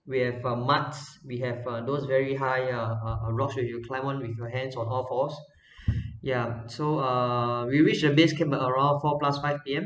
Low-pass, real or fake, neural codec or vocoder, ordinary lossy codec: none; real; none; none